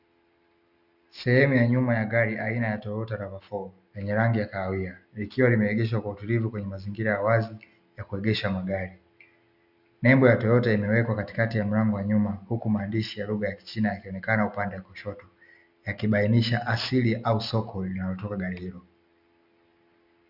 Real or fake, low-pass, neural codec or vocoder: real; 5.4 kHz; none